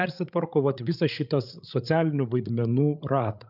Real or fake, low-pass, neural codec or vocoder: fake; 5.4 kHz; codec, 16 kHz, 8 kbps, FreqCodec, larger model